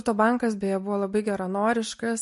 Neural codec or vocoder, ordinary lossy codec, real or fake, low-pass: none; MP3, 48 kbps; real; 14.4 kHz